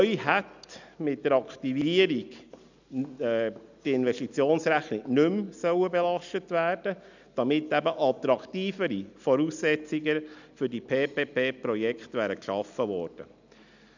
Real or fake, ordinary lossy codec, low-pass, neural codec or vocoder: real; none; 7.2 kHz; none